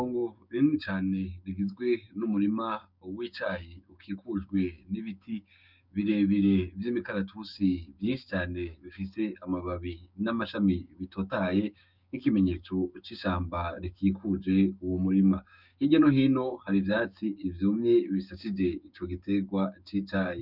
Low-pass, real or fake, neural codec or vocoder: 5.4 kHz; fake; codec, 16 kHz, 6 kbps, DAC